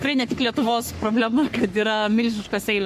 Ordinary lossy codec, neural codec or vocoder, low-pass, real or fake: MP3, 64 kbps; codec, 44.1 kHz, 3.4 kbps, Pupu-Codec; 14.4 kHz; fake